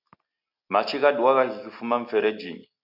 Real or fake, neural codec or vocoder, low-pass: real; none; 5.4 kHz